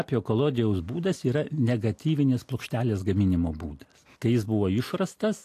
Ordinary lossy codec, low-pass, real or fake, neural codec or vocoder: AAC, 64 kbps; 14.4 kHz; real; none